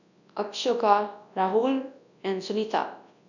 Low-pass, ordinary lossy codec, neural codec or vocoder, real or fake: 7.2 kHz; none; codec, 24 kHz, 0.9 kbps, WavTokenizer, large speech release; fake